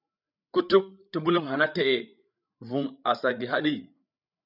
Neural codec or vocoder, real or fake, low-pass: codec, 16 kHz, 8 kbps, FreqCodec, larger model; fake; 5.4 kHz